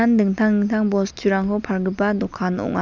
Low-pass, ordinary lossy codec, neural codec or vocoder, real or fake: 7.2 kHz; none; none; real